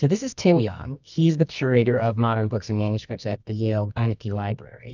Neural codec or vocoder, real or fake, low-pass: codec, 24 kHz, 0.9 kbps, WavTokenizer, medium music audio release; fake; 7.2 kHz